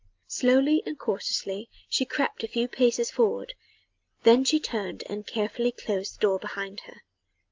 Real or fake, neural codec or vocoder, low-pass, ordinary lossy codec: real; none; 7.2 kHz; Opus, 24 kbps